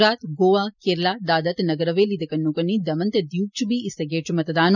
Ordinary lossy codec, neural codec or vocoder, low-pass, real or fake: none; none; 7.2 kHz; real